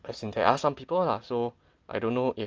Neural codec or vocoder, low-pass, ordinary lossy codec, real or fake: none; 7.2 kHz; Opus, 24 kbps; real